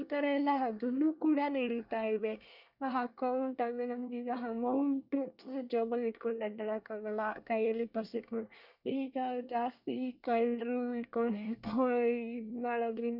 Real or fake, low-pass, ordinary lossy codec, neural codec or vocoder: fake; 5.4 kHz; none; codec, 24 kHz, 1 kbps, SNAC